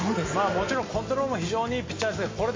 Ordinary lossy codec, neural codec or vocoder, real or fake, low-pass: MP3, 32 kbps; none; real; 7.2 kHz